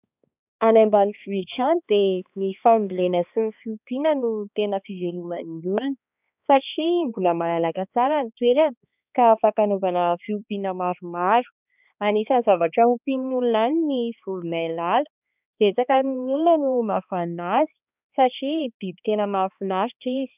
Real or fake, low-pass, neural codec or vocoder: fake; 3.6 kHz; codec, 16 kHz, 2 kbps, X-Codec, HuBERT features, trained on balanced general audio